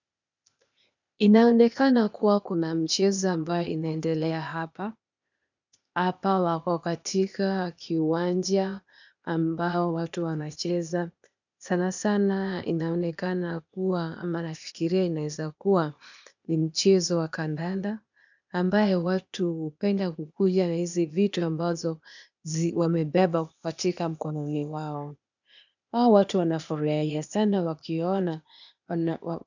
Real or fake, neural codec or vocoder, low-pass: fake; codec, 16 kHz, 0.8 kbps, ZipCodec; 7.2 kHz